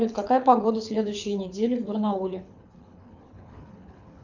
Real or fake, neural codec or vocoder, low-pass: fake; codec, 24 kHz, 6 kbps, HILCodec; 7.2 kHz